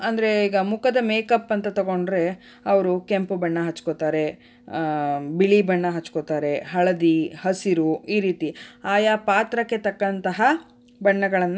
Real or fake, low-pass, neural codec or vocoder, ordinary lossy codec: real; none; none; none